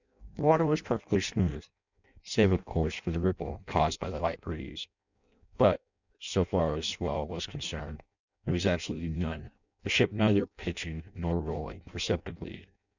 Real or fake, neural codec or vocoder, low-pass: fake; codec, 16 kHz in and 24 kHz out, 0.6 kbps, FireRedTTS-2 codec; 7.2 kHz